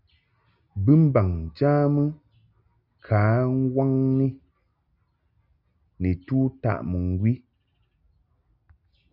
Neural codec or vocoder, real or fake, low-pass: none; real; 5.4 kHz